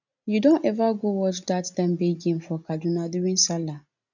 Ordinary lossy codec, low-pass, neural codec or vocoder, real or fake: none; 7.2 kHz; none; real